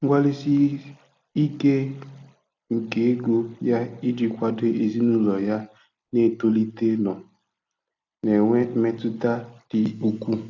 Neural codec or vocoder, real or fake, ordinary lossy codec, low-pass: none; real; AAC, 48 kbps; 7.2 kHz